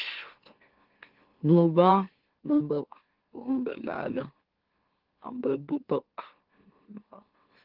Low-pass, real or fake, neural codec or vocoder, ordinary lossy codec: 5.4 kHz; fake; autoencoder, 44.1 kHz, a latent of 192 numbers a frame, MeloTTS; Opus, 16 kbps